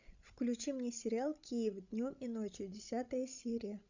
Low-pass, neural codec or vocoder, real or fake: 7.2 kHz; codec, 16 kHz, 16 kbps, FreqCodec, larger model; fake